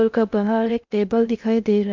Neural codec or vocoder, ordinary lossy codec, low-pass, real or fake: codec, 16 kHz in and 24 kHz out, 0.6 kbps, FocalCodec, streaming, 2048 codes; MP3, 64 kbps; 7.2 kHz; fake